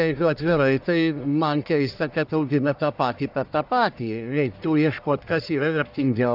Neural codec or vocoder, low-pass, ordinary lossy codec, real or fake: codec, 44.1 kHz, 1.7 kbps, Pupu-Codec; 5.4 kHz; AAC, 48 kbps; fake